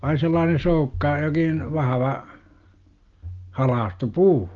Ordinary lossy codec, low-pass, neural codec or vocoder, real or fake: Opus, 32 kbps; 7.2 kHz; none; real